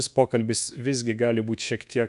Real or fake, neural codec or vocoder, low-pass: fake; codec, 24 kHz, 1.2 kbps, DualCodec; 10.8 kHz